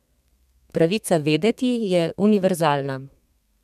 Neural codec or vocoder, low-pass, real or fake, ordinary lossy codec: codec, 32 kHz, 1.9 kbps, SNAC; 14.4 kHz; fake; none